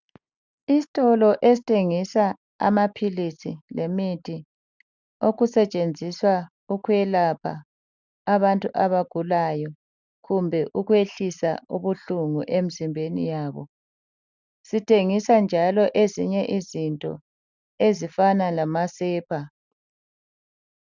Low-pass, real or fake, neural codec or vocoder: 7.2 kHz; real; none